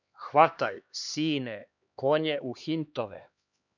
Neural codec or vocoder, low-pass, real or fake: codec, 16 kHz, 2 kbps, X-Codec, HuBERT features, trained on LibriSpeech; 7.2 kHz; fake